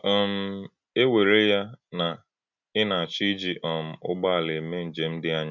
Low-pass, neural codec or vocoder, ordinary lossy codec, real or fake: 7.2 kHz; none; none; real